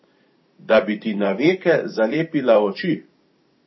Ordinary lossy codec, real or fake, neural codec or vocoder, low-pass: MP3, 24 kbps; fake; vocoder, 44.1 kHz, 128 mel bands every 512 samples, BigVGAN v2; 7.2 kHz